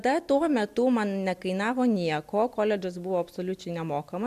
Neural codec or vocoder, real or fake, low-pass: none; real; 14.4 kHz